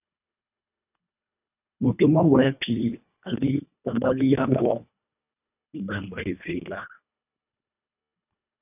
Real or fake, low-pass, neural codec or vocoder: fake; 3.6 kHz; codec, 24 kHz, 1.5 kbps, HILCodec